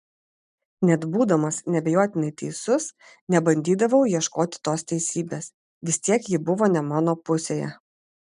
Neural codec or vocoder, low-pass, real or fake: none; 10.8 kHz; real